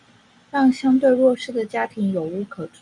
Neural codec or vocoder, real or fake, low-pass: none; real; 10.8 kHz